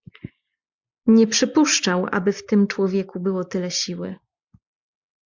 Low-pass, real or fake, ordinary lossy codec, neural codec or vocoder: 7.2 kHz; real; MP3, 64 kbps; none